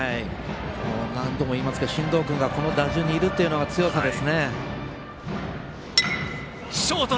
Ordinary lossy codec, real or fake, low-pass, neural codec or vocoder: none; real; none; none